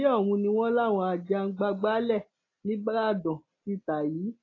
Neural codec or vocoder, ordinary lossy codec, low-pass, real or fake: none; AAC, 32 kbps; 7.2 kHz; real